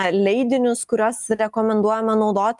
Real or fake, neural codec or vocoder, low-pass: real; none; 10.8 kHz